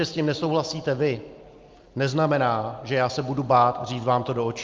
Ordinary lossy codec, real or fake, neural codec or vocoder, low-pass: Opus, 24 kbps; real; none; 7.2 kHz